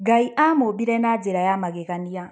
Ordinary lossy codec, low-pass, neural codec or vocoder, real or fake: none; none; none; real